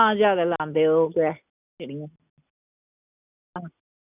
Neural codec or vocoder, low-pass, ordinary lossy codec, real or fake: none; 3.6 kHz; none; real